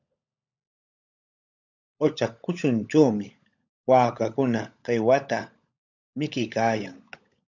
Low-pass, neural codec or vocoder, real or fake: 7.2 kHz; codec, 16 kHz, 16 kbps, FunCodec, trained on LibriTTS, 50 frames a second; fake